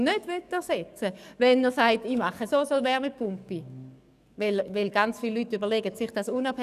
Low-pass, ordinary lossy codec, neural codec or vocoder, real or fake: 14.4 kHz; none; codec, 44.1 kHz, 7.8 kbps, DAC; fake